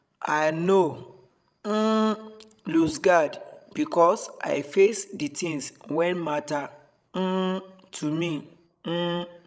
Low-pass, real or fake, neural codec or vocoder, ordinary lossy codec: none; fake; codec, 16 kHz, 16 kbps, FreqCodec, larger model; none